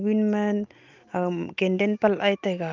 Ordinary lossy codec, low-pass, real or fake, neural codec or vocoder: Opus, 24 kbps; 7.2 kHz; real; none